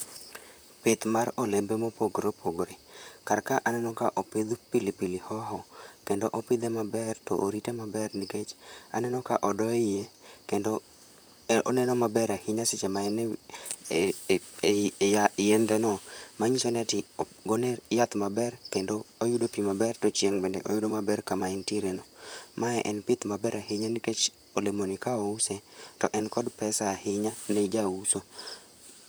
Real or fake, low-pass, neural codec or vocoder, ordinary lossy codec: fake; none; vocoder, 44.1 kHz, 128 mel bands, Pupu-Vocoder; none